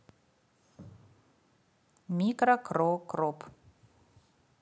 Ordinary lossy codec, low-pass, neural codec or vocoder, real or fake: none; none; none; real